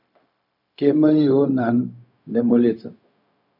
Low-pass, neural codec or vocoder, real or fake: 5.4 kHz; codec, 16 kHz, 0.4 kbps, LongCat-Audio-Codec; fake